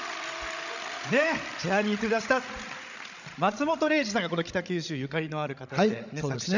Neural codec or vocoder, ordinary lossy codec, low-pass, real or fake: codec, 16 kHz, 16 kbps, FreqCodec, larger model; none; 7.2 kHz; fake